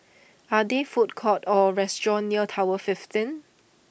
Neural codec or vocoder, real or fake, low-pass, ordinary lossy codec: none; real; none; none